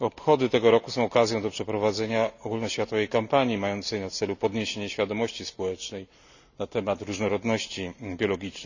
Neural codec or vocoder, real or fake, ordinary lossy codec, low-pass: none; real; none; 7.2 kHz